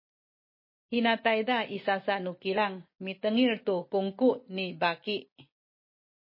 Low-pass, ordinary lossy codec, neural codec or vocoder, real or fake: 5.4 kHz; MP3, 24 kbps; none; real